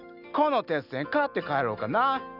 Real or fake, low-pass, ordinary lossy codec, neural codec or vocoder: real; 5.4 kHz; none; none